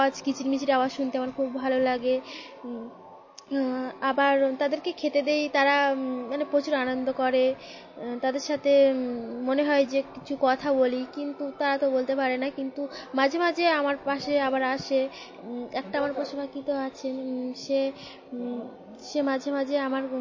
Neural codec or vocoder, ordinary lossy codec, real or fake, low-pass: none; MP3, 32 kbps; real; 7.2 kHz